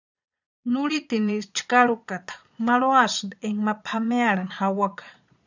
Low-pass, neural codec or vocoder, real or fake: 7.2 kHz; vocoder, 22.05 kHz, 80 mel bands, Vocos; fake